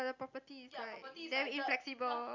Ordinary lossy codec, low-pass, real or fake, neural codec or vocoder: none; 7.2 kHz; real; none